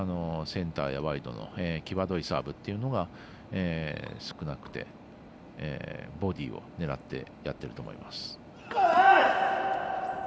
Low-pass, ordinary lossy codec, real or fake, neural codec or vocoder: none; none; real; none